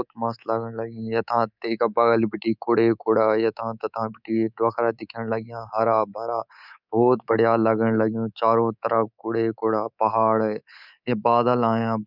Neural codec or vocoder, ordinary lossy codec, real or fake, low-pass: codec, 24 kHz, 3.1 kbps, DualCodec; none; fake; 5.4 kHz